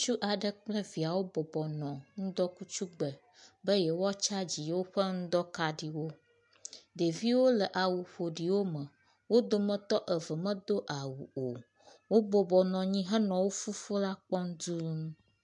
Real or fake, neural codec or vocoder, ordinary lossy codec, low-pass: real; none; MP3, 64 kbps; 10.8 kHz